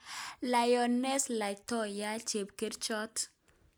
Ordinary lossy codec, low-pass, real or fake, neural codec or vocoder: none; none; real; none